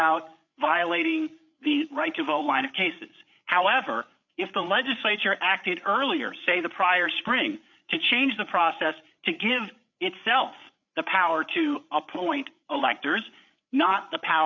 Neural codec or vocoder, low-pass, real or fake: codec, 16 kHz, 8 kbps, FreqCodec, larger model; 7.2 kHz; fake